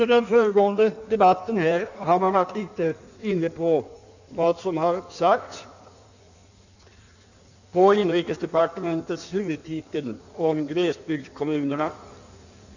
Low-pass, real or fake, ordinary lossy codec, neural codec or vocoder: 7.2 kHz; fake; none; codec, 16 kHz in and 24 kHz out, 1.1 kbps, FireRedTTS-2 codec